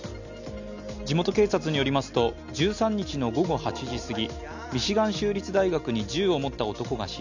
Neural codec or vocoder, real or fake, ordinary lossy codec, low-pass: none; real; none; 7.2 kHz